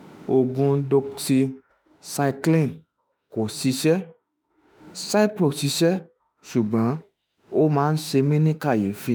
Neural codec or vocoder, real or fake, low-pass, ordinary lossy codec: autoencoder, 48 kHz, 32 numbers a frame, DAC-VAE, trained on Japanese speech; fake; none; none